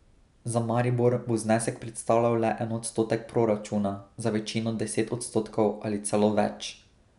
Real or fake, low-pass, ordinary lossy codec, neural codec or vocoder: real; 10.8 kHz; none; none